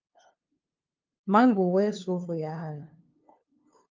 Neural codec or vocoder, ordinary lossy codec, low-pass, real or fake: codec, 16 kHz, 2 kbps, FunCodec, trained on LibriTTS, 25 frames a second; Opus, 24 kbps; 7.2 kHz; fake